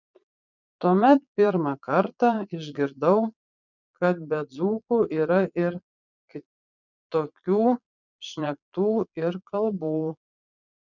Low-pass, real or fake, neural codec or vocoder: 7.2 kHz; fake; vocoder, 22.05 kHz, 80 mel bands, Vocos